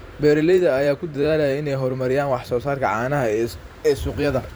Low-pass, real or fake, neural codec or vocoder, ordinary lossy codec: none; fake; vocoder, 44.1 kHz, 128 mel bands every 512 samples, BigVGAN v2; none